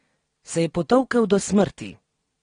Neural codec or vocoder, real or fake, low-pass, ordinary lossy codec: none; real; 9.9 kHz; AAC, 32 kbps